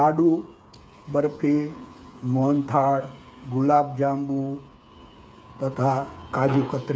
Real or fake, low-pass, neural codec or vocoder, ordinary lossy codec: fake; none; codec, 16 kHz, 8 kbps, FreqCodec, smaller model; none